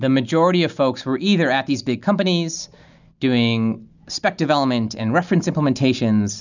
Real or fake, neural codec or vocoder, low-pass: real; none; 7.2 kHz